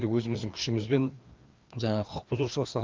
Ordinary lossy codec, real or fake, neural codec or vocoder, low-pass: Opus, 24 kbps; fake; codec, 16 kHz, 2 kbps, FreqCodec, larger model; 7.2 kHz